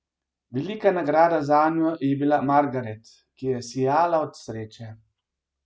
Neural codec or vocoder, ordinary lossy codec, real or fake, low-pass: none; none; real; none